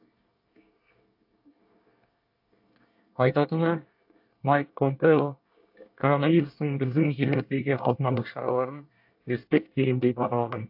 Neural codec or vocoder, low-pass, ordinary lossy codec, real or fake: codec, 24 kHz, 1 kbps, SNAC; 5.4 kHz; AAC, 48 kbps; fake